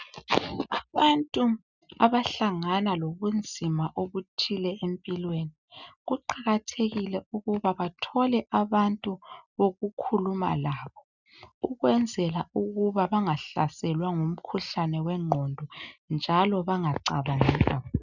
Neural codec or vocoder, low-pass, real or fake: none; 7.2 kHz; real